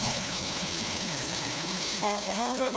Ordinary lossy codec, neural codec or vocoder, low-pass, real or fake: none; codec, 16 kHz, 1 kbps, FunCodec, trained on LibriTTS, 50 frames a second; none; fake